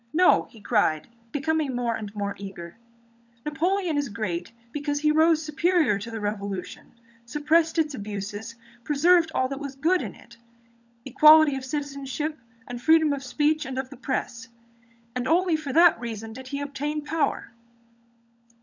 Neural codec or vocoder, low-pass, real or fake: codec, 16 kHz, 16 kbps, FunCodec, trained on LibriTTS, 50 frames a second; 7.2 kHz; fake